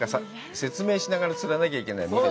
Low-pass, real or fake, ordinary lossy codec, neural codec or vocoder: none; real; none; none